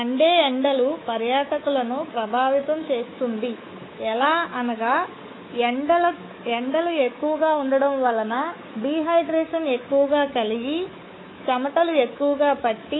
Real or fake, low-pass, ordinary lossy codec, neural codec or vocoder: fake; 7.2 kHz; AAC, 16 kbps; codec, 24 kHz, 3.1 kbps, DualCodec